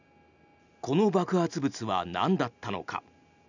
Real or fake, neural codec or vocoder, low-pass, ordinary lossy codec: real; none; 7.2 kHz; none